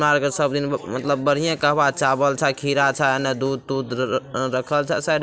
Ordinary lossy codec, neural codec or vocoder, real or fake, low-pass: none; none; real; none